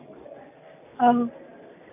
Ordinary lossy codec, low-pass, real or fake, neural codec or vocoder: none; 3.6 kHz; real; none